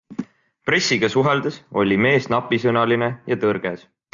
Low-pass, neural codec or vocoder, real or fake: 7.2 kHz; none; real